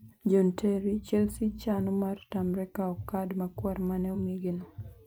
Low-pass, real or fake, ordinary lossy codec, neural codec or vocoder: none; fake; none; vocoder, 44.1 kHz, 128 mel bands every 512 samples, BigVGAN v2